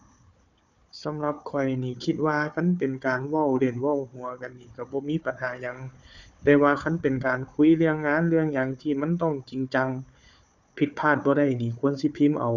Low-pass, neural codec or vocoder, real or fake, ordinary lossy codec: 7.2 kHz; codec, 16 kHz, 4 kbps, FunCodec, trained on Chinese and English, 50 frames a second; fake; none